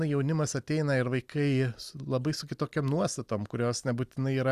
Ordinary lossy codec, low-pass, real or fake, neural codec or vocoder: Opus, 64 kbps; 14.4 kHz; real; none